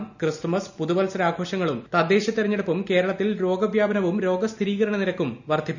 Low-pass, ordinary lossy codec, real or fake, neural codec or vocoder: 7.2 kHz; none; real; none